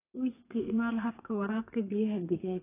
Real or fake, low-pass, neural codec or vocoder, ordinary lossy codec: fake; 3.6 kHz; codec, 32 kHz, 1.9 kbps, SNAC; AAC, 24 kbps